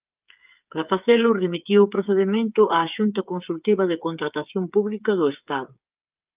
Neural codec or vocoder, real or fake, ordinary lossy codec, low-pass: codec, 16 kHz, 8 kbps, FreqCodec, smaller model; fake; Opus, 24 kbps; 3.6 kHz